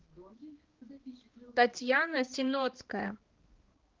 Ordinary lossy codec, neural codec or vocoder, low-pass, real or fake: Opus, 16 kbps; codec, 16 kHz, 2 kbps, X-Codec, HuBERT features, trained on balanced general audio; 7.2 kHz; fake